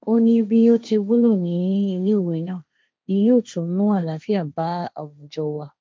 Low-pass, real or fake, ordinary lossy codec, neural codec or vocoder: none; fake; none; codec, 16 kHz, 1.1 kbps, Voila-Tokenizer